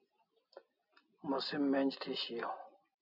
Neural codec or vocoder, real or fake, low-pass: none; real; 5.4 kHz